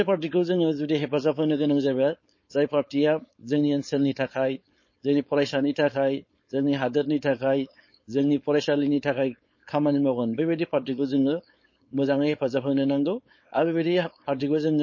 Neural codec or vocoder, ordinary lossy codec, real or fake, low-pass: codec, 16 kHz, 4.8 kbps, FACodec; MP3, 32 kbps; fake; 7.2 kHz